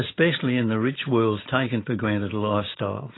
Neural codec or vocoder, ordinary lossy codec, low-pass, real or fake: none; AAC, 16 kbps; 7.2 kHz; real